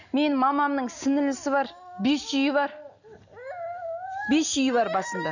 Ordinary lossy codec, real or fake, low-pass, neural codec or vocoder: none; real; 7.2 kHz; none